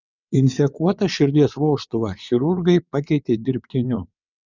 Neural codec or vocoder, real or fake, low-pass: vocoder, 22.05 kHz, 80 mel bands, WaveNeXt; fake; 7.2 kHz